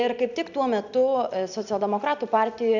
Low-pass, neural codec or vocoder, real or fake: 7.2 kHz; none; real